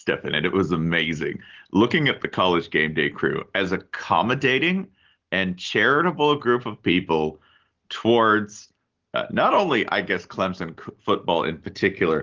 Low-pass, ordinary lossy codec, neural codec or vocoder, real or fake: 7.2 kHz; Opus, 16 kbps; none; real